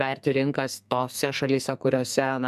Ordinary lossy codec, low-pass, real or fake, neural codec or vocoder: MP3, 96 kbps; 14.4 kHz; fake; codec, 44.1 kHz, 2.6 kbps, SNAC